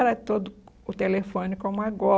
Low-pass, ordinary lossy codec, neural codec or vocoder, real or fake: none; none; none; real